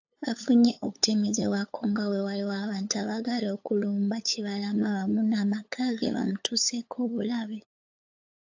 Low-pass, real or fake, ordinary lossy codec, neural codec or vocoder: 7.2 kHz; fake; AAC, 48 kbps; codec, 16 kHz, 8 kbps, FunCodec, trained on LibriTTS, 25 frames a second